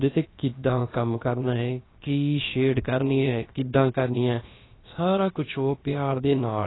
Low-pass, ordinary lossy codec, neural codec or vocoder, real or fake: 7.2 kHz; AAC, 16 kbps; codec, 16 kHz, about 1 kbps, DyCAST, with the encoder's durations; fake